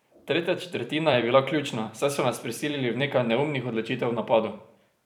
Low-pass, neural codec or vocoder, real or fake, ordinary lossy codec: 19.8 kHz; vocoder, 44.1 kHz, 128 mel bands every 256 samples, BigVGAN v2; fake; none